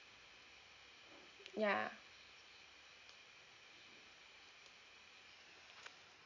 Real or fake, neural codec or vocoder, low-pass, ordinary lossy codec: real; none; 7.2 kHz; none